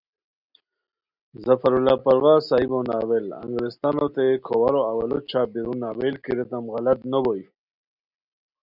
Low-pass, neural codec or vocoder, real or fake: 5.4 kHz; none; real